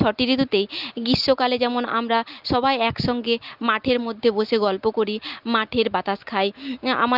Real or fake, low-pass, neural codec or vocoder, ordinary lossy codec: real; 5.4 kHz; none; Opus, 32 kbps